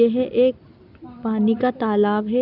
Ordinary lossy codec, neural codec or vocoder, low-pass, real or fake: none; none; 5.4 kHz; real